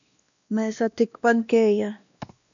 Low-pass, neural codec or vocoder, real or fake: 7.2 kHz; codec, 16 kHz, 1 kbps, X-Codec, WavLM features, trained on Multilingual LibriSpeech; fake